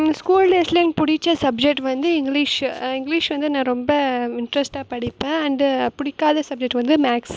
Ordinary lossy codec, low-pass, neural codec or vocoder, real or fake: none; none; none; real